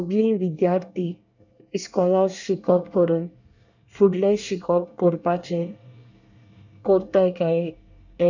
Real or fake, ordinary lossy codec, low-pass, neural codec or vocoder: fake; none; 7.2 kHz; codec, 24 kHz, 1 kbps, SNAC